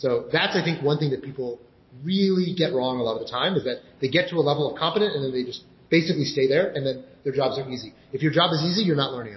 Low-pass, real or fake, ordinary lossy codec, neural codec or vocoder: 7.2 kHz; real; MP3, 24 kbps; none